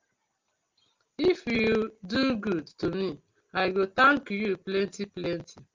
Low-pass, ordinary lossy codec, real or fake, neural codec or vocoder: 7.2 kHz; Opus, 32 kbps; real; none